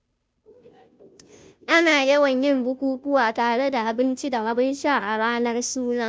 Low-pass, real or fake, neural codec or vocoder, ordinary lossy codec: none; fake; codec, 16 kHz, 0.5 kbps, FunCodec, trained on Chinese and English, 25 frames a second; none